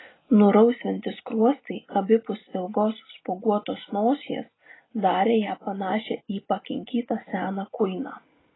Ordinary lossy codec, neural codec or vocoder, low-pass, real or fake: AAC, 16 kbps; none; 7.2 kHz; real